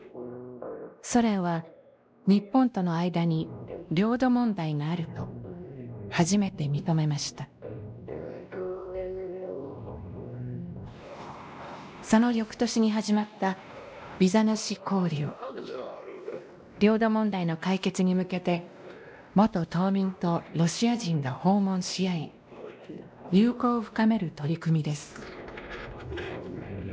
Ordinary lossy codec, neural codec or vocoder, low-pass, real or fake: none; codec, 16 kHz, 1 kbps, X-Codec, WavLM features, trained on Multilingual LibriSpeech; none; fake